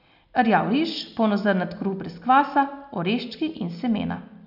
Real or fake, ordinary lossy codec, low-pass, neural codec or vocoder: real; none; 5.4 kHz; none